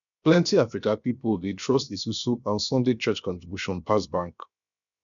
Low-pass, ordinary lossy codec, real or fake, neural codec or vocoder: 7.2 kHz; none; fake; codec, 16 kHz, 0.7 kbps, FocalCodec